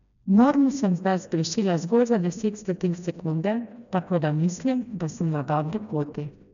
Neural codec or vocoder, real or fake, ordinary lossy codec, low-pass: codec, 16 kHz, 1 kbps, FreqCodec, smaller model; fake; none; 7.2 kHz